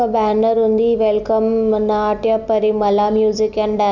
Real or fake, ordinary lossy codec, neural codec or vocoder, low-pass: real; none; none; 7.2 kHz